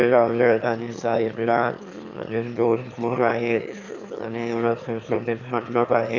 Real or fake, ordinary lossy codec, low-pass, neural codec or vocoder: fake; none; 7.2 kHz; autoencoder, 22.05 kHz, a latent of 192 numbers a frame, VITS, trained on one speaker